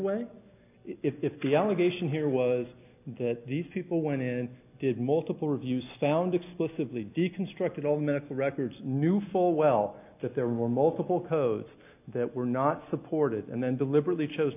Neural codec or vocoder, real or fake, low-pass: none; real; 3.6 kHz